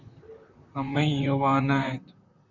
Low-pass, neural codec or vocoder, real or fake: 7.2 kHz; vocoder, 44.1 kHz, 128 mel bands, Pupu-Vocoder; fake